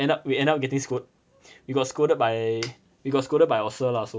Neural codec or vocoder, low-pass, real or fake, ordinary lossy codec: none; none; real; none